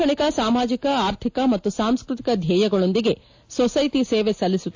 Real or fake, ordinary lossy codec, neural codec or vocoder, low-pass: real; AAC, 48 kbps; none; 7.2 kHz